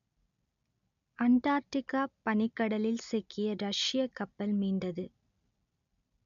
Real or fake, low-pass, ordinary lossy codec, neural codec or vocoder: real; 7.2 kHz; none; none